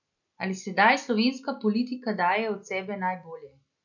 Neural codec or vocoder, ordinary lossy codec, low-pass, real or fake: none; none; 7.2 kHz; real